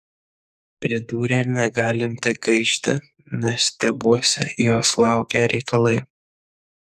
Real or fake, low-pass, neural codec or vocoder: fake; 14.4 kHz; codec, 44.1 kHz, 2.6 kbps, SNAC